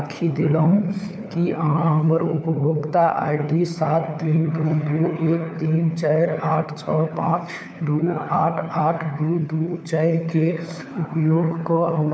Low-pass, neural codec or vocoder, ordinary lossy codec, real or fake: none; codec, 16 kHz, 4 kbps, FunCodec, trained on LibriTTS, 50 frames a second; none; fake